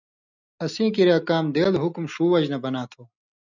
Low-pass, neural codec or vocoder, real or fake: 7.2 kHz; none; real